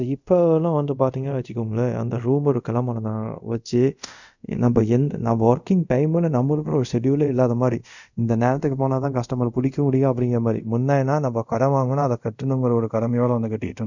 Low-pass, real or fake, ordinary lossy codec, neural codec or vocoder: 7.2 kHz; fake; none; codec, 24 kHz, 0.5 kbps, DualCodec